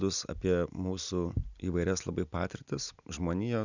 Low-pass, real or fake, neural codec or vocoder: 7.2 kHz; real; none